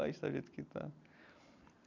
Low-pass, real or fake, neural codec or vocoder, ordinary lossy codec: 7.2 kHz; real; none; none